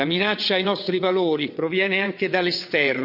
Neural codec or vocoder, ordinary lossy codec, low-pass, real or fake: vocoder, 22.05 kHz, 80 mel bands, WaveNeXt; none; 5.4 kHz; fake